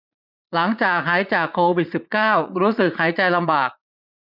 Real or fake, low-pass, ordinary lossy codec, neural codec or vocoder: fake; 5.4 kHz; none; vocoder, 44.1 kHz, 80 mel bands, Vocos